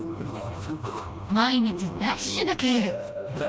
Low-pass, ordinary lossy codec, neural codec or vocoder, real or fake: none; none; codec, 16 kHz, 1 kbps, FreqCodec, smaller model; fake